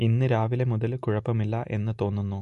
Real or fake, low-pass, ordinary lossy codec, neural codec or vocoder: real; 14.4 kHz; MP3, 48 kbps; none